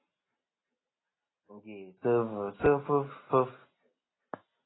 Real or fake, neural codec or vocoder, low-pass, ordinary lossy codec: real; none; 7.2 kHz; AAC, 16 kbps